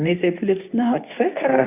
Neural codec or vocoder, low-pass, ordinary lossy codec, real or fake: codec, 24 kHz, 0.9 kbps, WavTokenizer, medium speech release version 2; 3.6 kHz; AAC, 32 kbps; fake